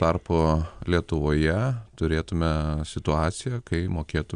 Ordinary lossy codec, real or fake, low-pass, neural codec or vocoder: Opus, 64 kbps; real; 9.9 kHz; none